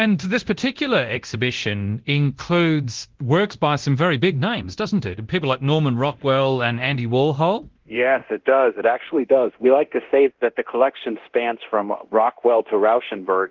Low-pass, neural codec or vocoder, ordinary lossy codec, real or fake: 7.2 kHz; codec, 24 kHz, 0.9 kbps, DualCodec; Opus, 16 kbps; fake